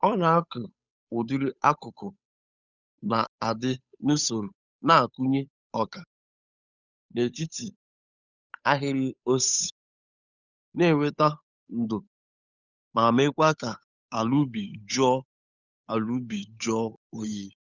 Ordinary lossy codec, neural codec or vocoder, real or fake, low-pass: none; codec, 16 kHz, 8 kbps, FunCodec, trained on Chinese and English, 25 frames a second; fake; 7.2 kHz